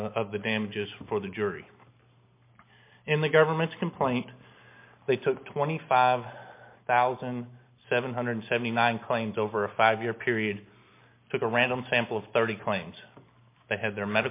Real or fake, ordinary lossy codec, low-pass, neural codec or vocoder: real; MP3, 24 kbps; 3.6 kHz; none